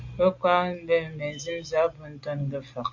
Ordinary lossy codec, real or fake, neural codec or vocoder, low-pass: AAC, 48 kbps; real; none; 7.2 kHz